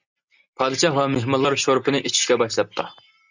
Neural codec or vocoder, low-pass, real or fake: none; 7.2 kHz; real